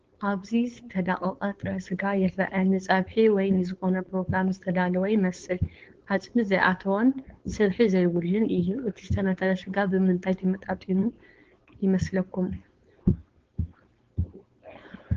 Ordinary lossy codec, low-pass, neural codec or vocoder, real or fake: Opus, 16 kbps; 7.2 kHz; codec, 16 kHz, 4.8 kbps, FACodec; fake